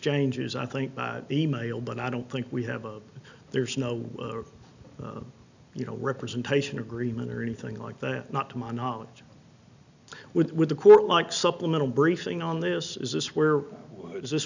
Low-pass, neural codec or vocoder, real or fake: 7.2 kHz; none; real